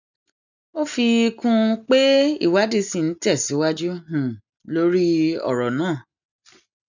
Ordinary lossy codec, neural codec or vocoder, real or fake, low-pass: none; none; real; 7.2 kHz